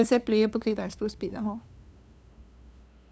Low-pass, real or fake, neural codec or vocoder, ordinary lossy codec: none; fake; codec, 16 kHz, 2 kbps, FunCodec, trained on LibriTTS, 25 frames a second; none